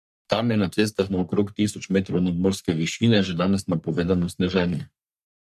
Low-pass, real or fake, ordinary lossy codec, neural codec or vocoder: 14.4 kHz; fake; MP3, 96 kbps; codec, 44.1 kHz, 3.4 kbps, Pupu-Codec